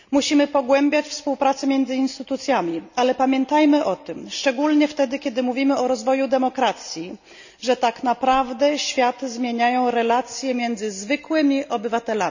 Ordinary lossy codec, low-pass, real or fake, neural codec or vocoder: none; 7.2 kHz; real; none